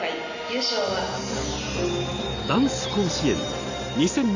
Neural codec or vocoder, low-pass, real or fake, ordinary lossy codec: none; 7.2 kHz; real; AAC, 48 kbps